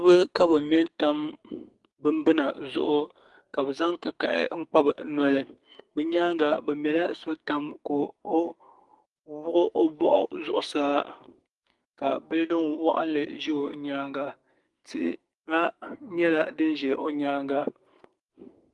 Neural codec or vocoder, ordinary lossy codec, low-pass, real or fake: codec, 44.1 kHz, 2.6 kbps, SNAC; Opus, 24 kbps; 10.8 kHz; fake